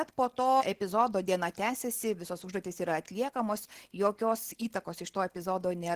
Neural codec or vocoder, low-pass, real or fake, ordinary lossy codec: none; 14.4 kHz; real; Opus, 16 kbps